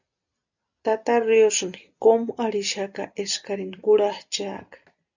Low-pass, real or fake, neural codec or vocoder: 7.2 kHz; real; none